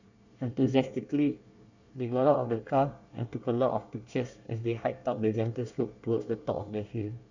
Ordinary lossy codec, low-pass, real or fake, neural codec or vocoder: none; 7.2 kHz; fake; codec, 24 kHz, 1 kbps, SNAC